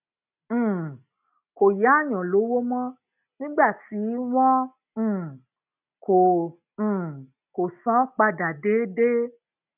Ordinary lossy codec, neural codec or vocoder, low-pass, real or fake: none; none; 3.6 kHz; real